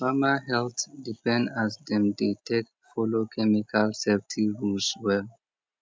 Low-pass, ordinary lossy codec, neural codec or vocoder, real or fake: none; none; none; real